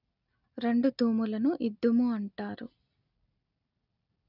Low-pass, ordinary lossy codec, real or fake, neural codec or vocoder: 5.4 kHz; none; real; none